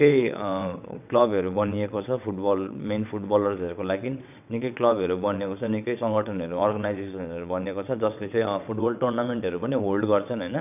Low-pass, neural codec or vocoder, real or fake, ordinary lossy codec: 3.6 kHz; vocoder, 22.05 kHz, 80 mel bands, WaveNeXt; fake; none